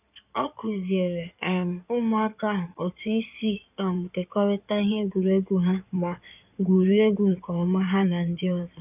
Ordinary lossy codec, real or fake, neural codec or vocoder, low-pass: none; fake; codec, 16 kHz in and 24 kHz out, 2.2 kbps, FireRedTTS-2 codec; 3.6 kHz